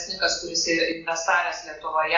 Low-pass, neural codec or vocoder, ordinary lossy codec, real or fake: 7.2 kHz; none; AAC, 48 kbps; real